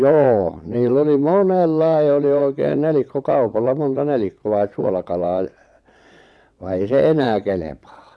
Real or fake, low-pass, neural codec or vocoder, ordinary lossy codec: fake; none; vocoder, 22.05 kHz, 80 mel bands, WaveNeXt; none